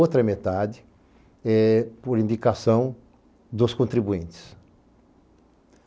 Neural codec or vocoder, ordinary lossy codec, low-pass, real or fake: none; none; none; real